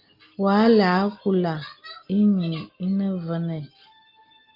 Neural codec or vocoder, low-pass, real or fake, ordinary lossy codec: none; 5.4 kHz; real; Opus, 24 kbps